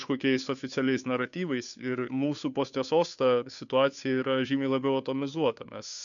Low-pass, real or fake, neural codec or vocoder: 7.2 kHz; fake; codec, 16 kHz, 2 kbps, FunCodec, trained on Chinese and English, 25 frames a second